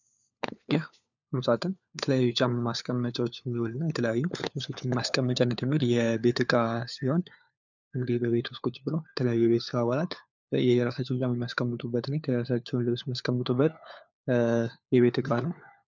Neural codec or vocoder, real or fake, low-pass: codec, 16 kHz, 4 kbps, FunCodec, trained on LibriTTS, 50 frames a second; fake; 7.2 kHz